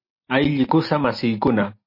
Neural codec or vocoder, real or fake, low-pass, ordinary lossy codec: none; real; 5.4 kHz; MP3, 32 kbps